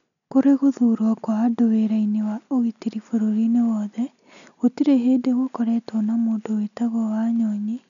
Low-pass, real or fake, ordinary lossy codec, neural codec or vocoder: 7.2 kHz; real; none; none